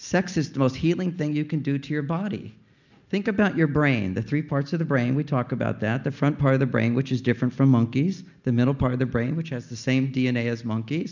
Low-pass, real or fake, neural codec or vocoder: 7.2 kHz; real; none